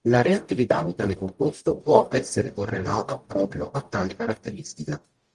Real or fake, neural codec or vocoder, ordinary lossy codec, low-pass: fake; codec, 44.1 kHz, 0.9 kbps, DAC; Opus, 32 kbps; 10.8 kHz